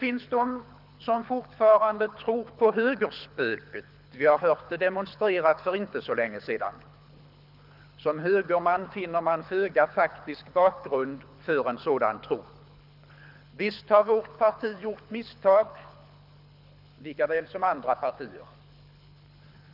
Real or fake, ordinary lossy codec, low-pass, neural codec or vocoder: fake; none; 5.4 kHz; codec, 24 kHz, 6 kbps, HILCodec